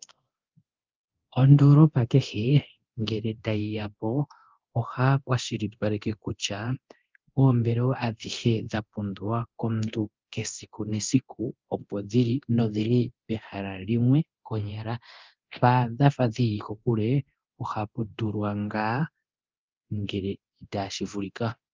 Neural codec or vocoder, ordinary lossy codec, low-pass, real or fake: codec, 24 kHz, 0.9 kbps, DualCodec; Opus, 24 kbps; 7.2 kHz; fake